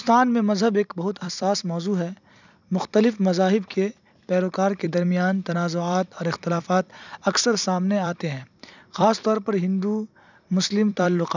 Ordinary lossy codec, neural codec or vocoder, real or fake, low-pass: none; vocoder, 44.1 kHz, 128 mel bands every 256 samples, BigVGAN v2; fake; 7.2 kHz